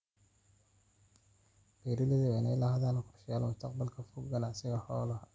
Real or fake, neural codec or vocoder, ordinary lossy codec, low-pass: real; none; none; none